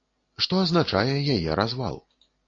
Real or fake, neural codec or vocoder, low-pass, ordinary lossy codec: real; none; 7.2 kHz; AAC, 32 kbps